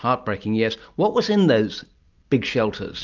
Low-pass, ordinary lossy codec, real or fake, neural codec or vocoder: 7.2 kHz; Opus, 24 kbps; real; none